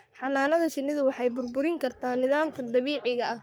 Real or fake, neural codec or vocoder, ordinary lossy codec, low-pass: fake; codec, 44.1 kHz, 3.4 kbps, Pupu-Codec; none; none